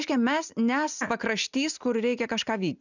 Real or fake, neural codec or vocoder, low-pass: real; none; 7.2 kHz